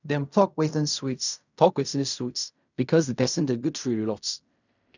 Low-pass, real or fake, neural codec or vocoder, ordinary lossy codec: 7.2 kHz; fake; codec, 16 kHz in and 24 kHz out, 0.4 kbps, LongCat-Audio-Codec, fine tuned four codebook decoder; none